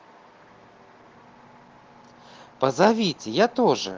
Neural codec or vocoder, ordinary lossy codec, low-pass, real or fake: none; Opus, 16 kbps; 7.2 kHz; real